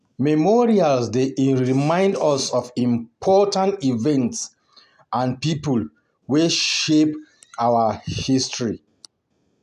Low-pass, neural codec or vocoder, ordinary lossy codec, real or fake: 14.4 kHz; none; none; real